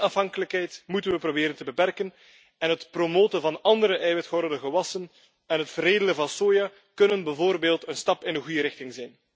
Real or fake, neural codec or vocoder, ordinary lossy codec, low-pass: real; none; none; none